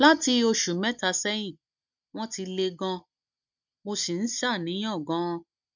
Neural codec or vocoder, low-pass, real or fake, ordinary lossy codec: none; 7.2 kHz; real; none